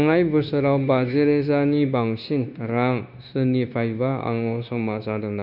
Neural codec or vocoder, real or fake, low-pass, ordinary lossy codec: codec, 16 kHz, 0.9 kbps, LongCat-Audio-Codec; fake; 5.4 kHz; none